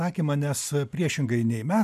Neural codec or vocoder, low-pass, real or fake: none; 14.4 kHz; real